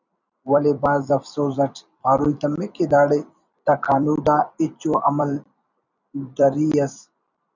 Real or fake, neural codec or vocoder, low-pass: fake; vocoder, 44.1 kHz, 128 mel bands every 256 samples, BigVGAN v2; 7.2 kHz